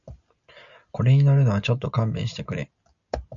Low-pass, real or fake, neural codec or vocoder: 7.2 kHz; real; none